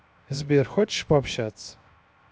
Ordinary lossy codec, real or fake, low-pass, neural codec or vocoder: none; fake; none; codec, 16 kHz, 0.7 kbps, FocalCodec